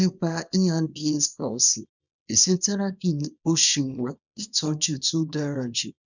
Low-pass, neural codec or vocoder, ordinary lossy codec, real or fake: 7.2 kHz; codec, 24 kHz, 0.9 kbps, WavTokenizer, small release; none; fake